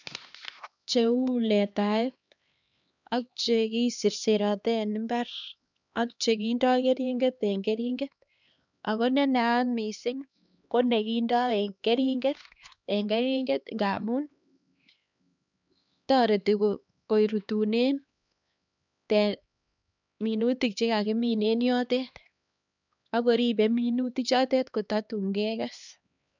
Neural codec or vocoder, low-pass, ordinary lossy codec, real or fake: codec, 16 kHz, 2 kbps, X-Codec, HuBERT features, trained on LibriSpeech; 7.2 kHz; none; fake